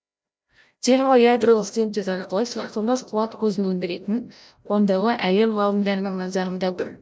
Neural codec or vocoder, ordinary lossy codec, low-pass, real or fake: codec, 16 kHz, 0.5 kbps, FreqCodec, larger model; none; none; fake